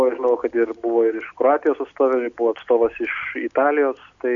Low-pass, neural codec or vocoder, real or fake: 7.2 kHz; none; real